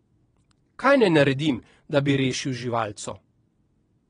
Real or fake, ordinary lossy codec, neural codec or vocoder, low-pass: fake; AAC, 32 kbps; vocoder, 22.05 kHz, 80 mel bands, WaveNeXt; 9.9 kHz